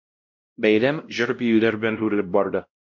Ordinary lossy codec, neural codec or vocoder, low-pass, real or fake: MP3, 64 kbps; codec, 16 kHz, 0.5 kbps, X-Codec, WavLM features, trained on Multilingual LibriSpeech; 7.2 kHz; fake